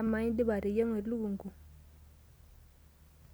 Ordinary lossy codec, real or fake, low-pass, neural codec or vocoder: none; real; none; none